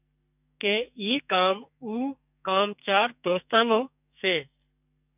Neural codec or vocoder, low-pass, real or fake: codec, 44.1 kHz, 2.6 kbps, SNAC; 3.6 kHz; fake